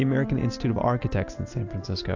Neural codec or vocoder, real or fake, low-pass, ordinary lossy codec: none; real; 7.2 kHz; AAC, 48 kbps